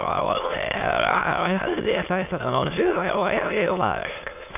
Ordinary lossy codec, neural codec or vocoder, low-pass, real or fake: AAC, 32 kbps; autoencoder, 22.05 kHz, a latent of 192 numbers a frame, VITS, trained on many speakers; 3.6 kHz; fake